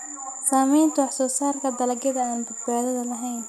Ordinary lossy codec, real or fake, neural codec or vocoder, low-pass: none; real; none; 14.4 kHz